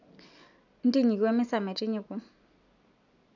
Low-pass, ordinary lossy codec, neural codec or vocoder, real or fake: 7.2 kHz; none; none; real